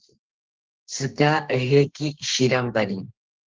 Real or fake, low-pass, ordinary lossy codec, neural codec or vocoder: fake; 7.2 kHz; Opus, 16 kbps; codec, 32 kHz, 1.9 kbps, SNAC